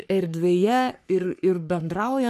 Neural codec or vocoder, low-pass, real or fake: codec, 44.1 kHz, 3.4 kbps, Pupu-Codec; 14.4 kHz; fake